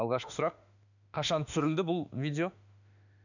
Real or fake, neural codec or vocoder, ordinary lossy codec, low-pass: fake; autoencoder, 48 kHz, 32 numbers a frame, DAC-VAE, trained on Japanese speech; none; 7.2 kHz